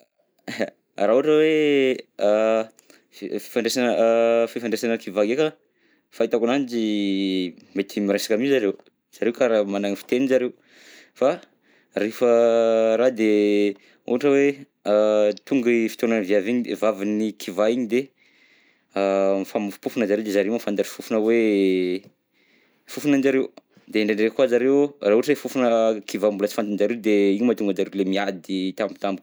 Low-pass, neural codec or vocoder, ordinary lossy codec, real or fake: none; none; none; real